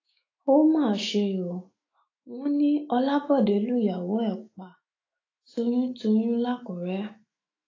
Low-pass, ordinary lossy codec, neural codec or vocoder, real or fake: 7.2 kHz; AAC, 32 kbps; autoencoder, 48 kHz, 128 numbers a frame, DAC-VAE, trained on Japanese speech; fake